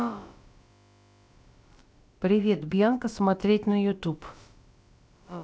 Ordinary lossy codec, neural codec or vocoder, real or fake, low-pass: none; codec, 16 kHz, about 1 kbps, DyCAST, with the encoder's durations; fake; none